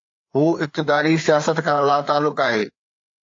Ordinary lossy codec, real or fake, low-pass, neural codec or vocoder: AAC, 48 kbps; fake; 7.2 kHz; codec, 16 kHz, 4 kbps, FreqCodec, larger model